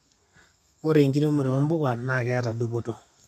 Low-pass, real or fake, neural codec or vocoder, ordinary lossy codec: 14.4 kHz; fake; codec, 32 kHz, 1.9 kbps, SNAC; none